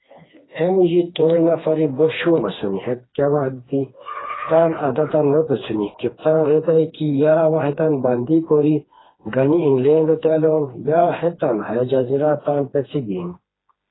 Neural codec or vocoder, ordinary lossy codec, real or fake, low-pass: codec, 16 kHz, 2 kbps, FreqCodec, smaller model; AAC, 16 kbps; fake; 7.2 kHz